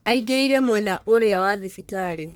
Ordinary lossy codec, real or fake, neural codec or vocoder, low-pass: none; fake; codec, 44.1 kHz, 1.7 kbps, Pupu-Codec; none